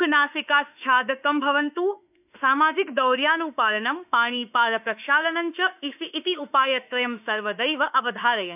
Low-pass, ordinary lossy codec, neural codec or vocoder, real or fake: 3.6 kHz; none; autoencoder, 48 kHz, 32 numbers a frame, DAC-VAE, trained on Japanese speech; fake